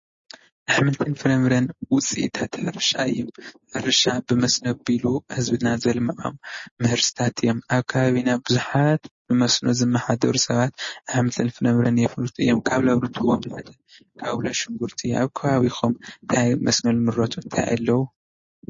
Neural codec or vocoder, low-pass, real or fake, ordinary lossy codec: none; 7.2 kHz; real; MP3, 32 kbps